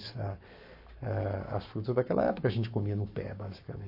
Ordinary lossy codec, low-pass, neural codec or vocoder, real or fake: MP3, 32 kbps; 5.4 kHz; codec, 44.1 kHz, 7.8 kbps, Pupu-Codec; fake